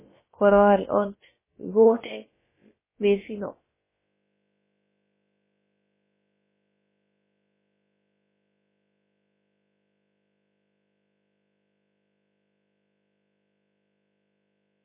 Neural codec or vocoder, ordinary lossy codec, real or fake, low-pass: codec, 16 kHz, about 1 kbps, DyCAST, with the encoder's durations; MP3, 16 kbps; fake; 3.6 kHz